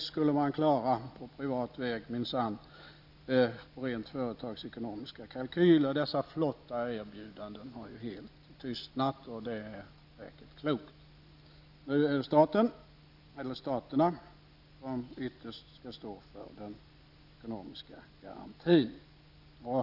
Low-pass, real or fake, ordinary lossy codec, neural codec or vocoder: 5.4 kHz; real; none; none